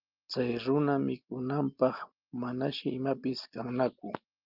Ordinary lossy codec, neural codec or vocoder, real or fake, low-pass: Opus, 24 kbps; none; real; 5.4 kHz